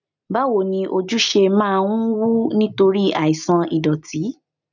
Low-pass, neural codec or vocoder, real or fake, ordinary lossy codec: 7.2 kHz; none; real; none